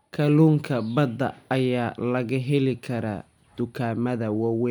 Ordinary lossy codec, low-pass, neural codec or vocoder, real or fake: none; 19.8 kHz; none; real